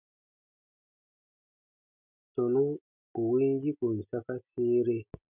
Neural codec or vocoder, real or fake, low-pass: none; real; 3.6 kHz